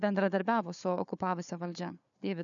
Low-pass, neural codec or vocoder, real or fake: 7.2 kHz; codec, 16 kHz, 4 kbps, FunCodec, trained on Chinese and English, 50 frames a second; fake